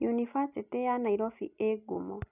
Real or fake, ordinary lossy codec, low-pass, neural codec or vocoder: real; none; 3.6 kHz; none